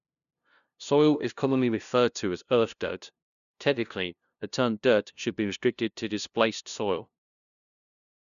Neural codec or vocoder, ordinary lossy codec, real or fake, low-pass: codec, 16 kHz, 0.5 kbps, FunCodec, trained on LibriTTS, 25 frames a second; none; fake; 7.2 kHz